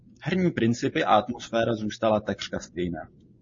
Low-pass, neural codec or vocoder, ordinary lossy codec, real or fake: 7.2 kHz; none; MP3, 32 kbps; real